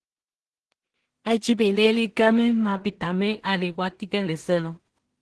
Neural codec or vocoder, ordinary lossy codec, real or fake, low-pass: codec, 16 kHz in and 24 kHz out, 0.4 kbps, LongCat-Audio-Codec, two codebook decoder; Opus, 16 kbps; fake; 10.8 kHz